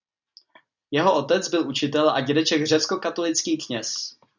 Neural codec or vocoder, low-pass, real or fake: none; 7.2 kHz; real